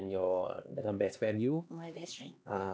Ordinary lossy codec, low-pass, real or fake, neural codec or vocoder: none; none; fake; codec, 16 kHz, 2 kbps, X-Codec, HuBERT features, trained on LibriSpeech